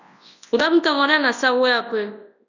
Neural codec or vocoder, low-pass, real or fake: codec, 24 kHz, 0.9 kbps, WavTokenizer, large speech release; 7.2 kHz; fake